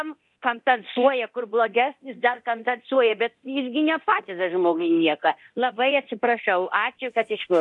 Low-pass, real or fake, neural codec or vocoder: 10.8 kHz; fake; codec, 24 kHz, 0.9 kbps, DualCodec